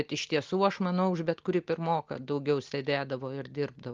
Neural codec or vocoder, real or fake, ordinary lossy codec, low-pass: none; real; Opus, 32 kbps; 7.2 kHz